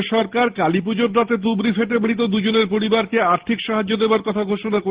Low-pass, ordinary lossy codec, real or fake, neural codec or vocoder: 3.6 kHz; Opus, 16 kbps; real; none